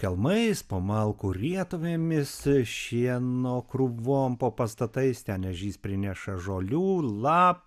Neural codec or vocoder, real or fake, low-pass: none; real; 14.4 kHz